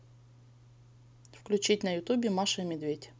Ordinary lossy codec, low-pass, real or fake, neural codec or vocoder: none; none; real; none